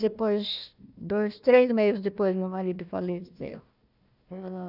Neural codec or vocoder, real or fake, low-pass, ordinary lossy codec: codec, 16 kHz, 1 kbps, FunCodec, trained on Chinese and English, 50 frames a second; fake; 5.4 kHz; none